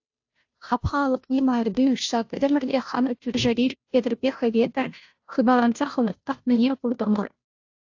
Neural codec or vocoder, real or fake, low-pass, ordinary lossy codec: codec, 16 kHz, 0.5 kbps, FunCodec, trained on Chinese and English, 25 frames a second; fake; 7.2 kHz; AAC, 48 kbps